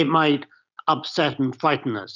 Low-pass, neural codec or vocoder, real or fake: 7.2 kHz; none; real